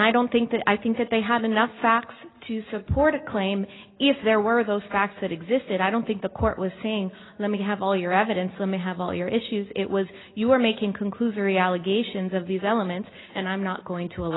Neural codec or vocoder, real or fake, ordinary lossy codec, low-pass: none; real; AAC, 16 kbps; 7.2 kHz